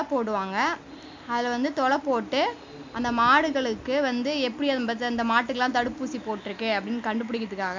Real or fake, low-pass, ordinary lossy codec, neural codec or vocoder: real; 7.2 kHz; MP3, 64 kbps; none